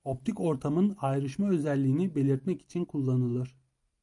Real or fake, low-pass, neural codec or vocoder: real; 10.8 kHz; none